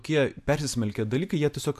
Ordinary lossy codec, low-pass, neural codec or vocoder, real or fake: AAC, 96 kbps; 14.4 kHz; none; real